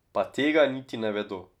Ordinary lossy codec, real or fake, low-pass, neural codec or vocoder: none; real; 19.8 kHz; none